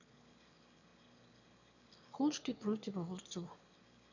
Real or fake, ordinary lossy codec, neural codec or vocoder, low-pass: fake; none; autoencoder, 22.05 kHz, a latent of 192 numbers a frame, VITS, trained on one speaker; 7.2 kHz